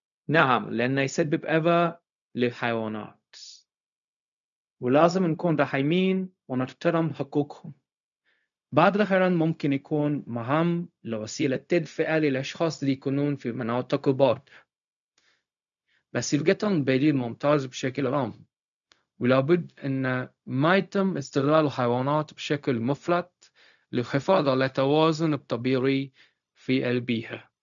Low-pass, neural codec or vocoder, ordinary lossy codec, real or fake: 7.2 kHz; codec, 16 kHz, 0.4 kbps, LongCat-Audio-Codec; none; fake